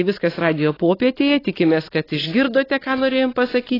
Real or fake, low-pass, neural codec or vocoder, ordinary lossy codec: real; 5.4 kHz; none; AAC, 24 kbps